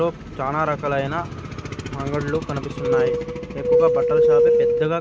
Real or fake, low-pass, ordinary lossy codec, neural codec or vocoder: real; none; none; none